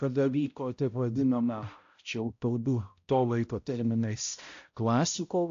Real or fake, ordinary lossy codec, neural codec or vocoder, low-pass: fake; AAC, 48 kbps; codec, 16 kHz, 0.5 kbps, X-Codec, HuBERT features, trained on balanced general audio; 7.2 kHz